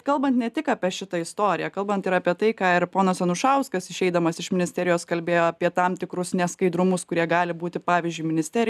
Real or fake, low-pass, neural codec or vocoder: real; 14.4 kHz; none